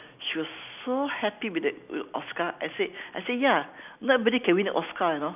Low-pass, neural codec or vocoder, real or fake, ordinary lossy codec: 3.6 kHz; none; real; none